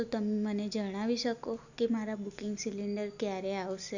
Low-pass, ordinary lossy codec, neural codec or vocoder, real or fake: 7.2 kHz; none; none; real